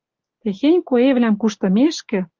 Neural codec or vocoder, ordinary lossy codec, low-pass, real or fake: none; Opus, 24 kbps; 7.2 kHz; real